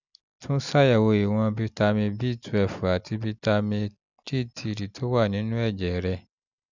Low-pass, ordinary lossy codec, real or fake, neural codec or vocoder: 7.2 kHz; none; real; none